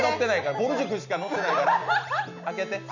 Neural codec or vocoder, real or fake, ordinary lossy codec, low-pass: none; real; none; 7.2 kHz